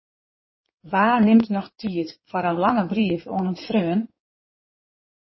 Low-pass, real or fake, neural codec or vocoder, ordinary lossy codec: 7.2 kHz; fake; codec, 16 kHz in and 24 kHz out, 2.2 kbps, FireRedTTS-2 codec; MP3, 24 kbps